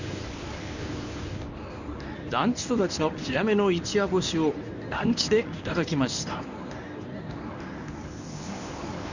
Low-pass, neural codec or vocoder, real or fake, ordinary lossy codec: 7.2 kHz; codec, 24 kHz, 0.9 kbps, WavTokenizer, medium speech release version 1; fake; none